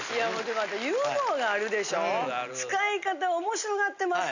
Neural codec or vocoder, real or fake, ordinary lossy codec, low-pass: none; real; none; 7.2 kHz